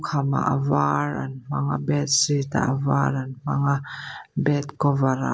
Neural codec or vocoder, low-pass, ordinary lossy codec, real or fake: none; none; none; real